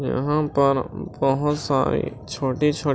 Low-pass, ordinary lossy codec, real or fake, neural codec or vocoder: none; none; real; none